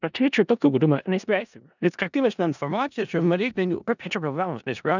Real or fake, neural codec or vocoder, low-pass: fake; codec, 16 kHz in and 24 kHz out, 0.4 kbps, LongCat-Audio-Codec, four codebook decoder; 7.2 kHz